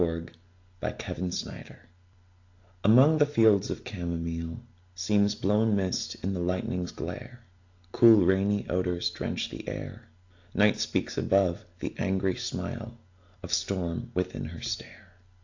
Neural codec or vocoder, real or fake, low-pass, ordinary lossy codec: none; real; 7.2 kHz; AAC, 48 kbps